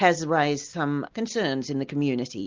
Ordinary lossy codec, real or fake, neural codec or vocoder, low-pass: Opus, 32 kbps; real; none; 7.2 kHz